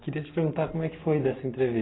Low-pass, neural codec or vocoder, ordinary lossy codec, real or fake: 7.2 kHz; none; AAC, 16 kbps; real